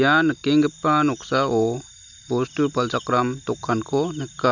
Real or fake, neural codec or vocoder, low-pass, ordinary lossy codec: real; none; 7.2 kHz; none